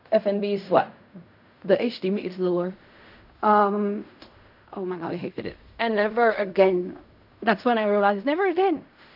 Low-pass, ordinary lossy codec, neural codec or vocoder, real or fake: 5.4 kHz; none; codec, 16 kHz in and 24 kHz out, 0.4 kbps, LongCat-Audio-Codec, fine tuned four codebook decoder; fake